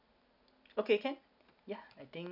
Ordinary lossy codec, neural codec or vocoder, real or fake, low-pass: none; none; real; 5.4 kHz